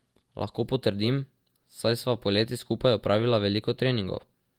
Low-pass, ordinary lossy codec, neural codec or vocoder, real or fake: 19.8 kHz; Opus, 32 kbps; vocoder, 48 kHz, 128 mel bands, Vocos; fake